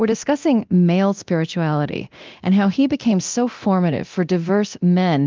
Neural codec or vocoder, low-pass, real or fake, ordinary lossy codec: codec, 24 kHz, 0.9 kbps, DualCodec; 7.2 kHz; fake; Opus, 32 kbps